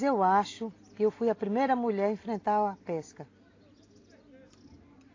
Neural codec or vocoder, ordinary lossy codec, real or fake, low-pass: none; AAC, 32 kbps; real; 7.2 kHz